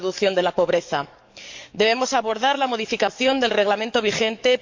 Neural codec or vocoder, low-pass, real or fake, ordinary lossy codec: codec, 44.1 kHz, 7.8 kbps, DAC; 7.2 kHz; fake; MP3, 64 kbps